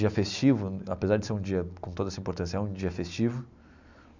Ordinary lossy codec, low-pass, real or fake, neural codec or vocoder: none; 7.2 kHz; real; none